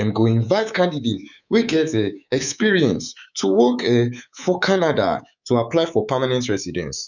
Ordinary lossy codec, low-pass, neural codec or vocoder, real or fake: none; 7.2 kHz; codec, 24 kHz, 3.1 kbps, DualCodec; fake